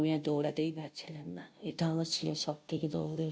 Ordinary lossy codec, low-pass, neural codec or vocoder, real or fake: none; none; codec, 16 kHz, 0.5 kbps, FunCodec, trained on Chinese and English, 25 frames a second; fake